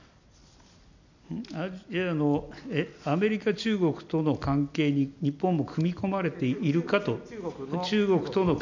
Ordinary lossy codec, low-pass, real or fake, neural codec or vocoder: none; 7.2 kHz; real; none